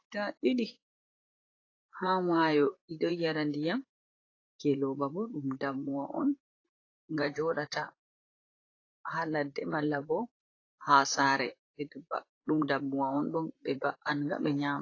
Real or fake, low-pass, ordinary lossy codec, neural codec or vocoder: fake; 7.2 kHz; AAC, 32 kbps; vocoder, 44.1 kHz, 128 mel bands, Pupu-Vocoder